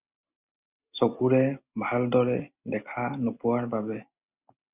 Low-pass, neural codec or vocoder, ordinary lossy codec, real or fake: 3.6 kHz; none; Opus, 64 kbps; real